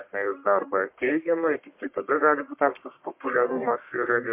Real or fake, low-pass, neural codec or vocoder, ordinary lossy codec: fake; 3.6 kHz; codec, 44.1 kHz, 1.7 kbps, Pupu-Codec; MP3, 32 kbps